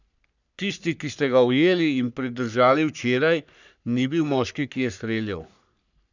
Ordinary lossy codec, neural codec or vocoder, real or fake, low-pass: none; codec, 44.1 kHz, 3.4 kbps, Pupu-Codec; fake; 7.2 kHz